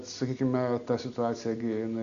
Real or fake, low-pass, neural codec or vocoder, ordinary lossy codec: real; 7.2 kHz; none; Opus, 64 kbps